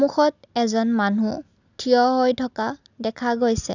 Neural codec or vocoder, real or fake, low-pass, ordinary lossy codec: none; real; 7.2 kHz; none